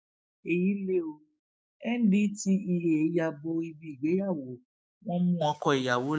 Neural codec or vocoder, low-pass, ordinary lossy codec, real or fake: codec, 16 kHz, 6 kbps, DAC; none; none; fake